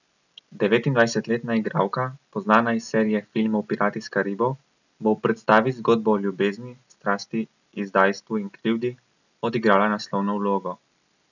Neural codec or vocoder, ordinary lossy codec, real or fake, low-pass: none; none; real; 7.2 kHz